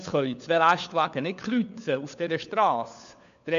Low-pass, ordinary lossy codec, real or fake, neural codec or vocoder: 7.2 kHz; none; fake; codec, 16 kHz, 4 kbps, FunCodec, trained on LibriTTS, 50 frames a second